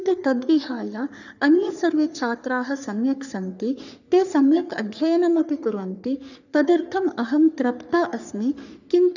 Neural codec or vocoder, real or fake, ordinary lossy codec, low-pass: codec, 44.1 kHz, 3.4 kbps, Pupu-Codec; fake; none; 7.2 kHz